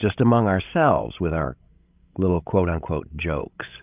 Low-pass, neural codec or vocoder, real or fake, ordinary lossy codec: 3.6 kHz; codec, 16 kHz, 16 kbps, FreqCodec, larger model; fake; Opus, 24 kbps